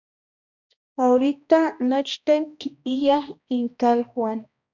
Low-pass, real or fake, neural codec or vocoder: 7.2 kHz; fake; codec, 16 kHz, 1 kbps, X-Codec, HuBERT features, trained on balanced general audio